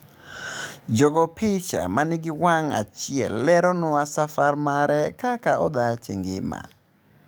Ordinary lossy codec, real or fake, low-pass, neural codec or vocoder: none; fake; none; codec, 44.1 kHz, 7.8 kbps, DAC